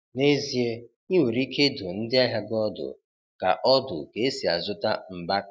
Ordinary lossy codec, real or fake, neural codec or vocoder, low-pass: none; real; none; none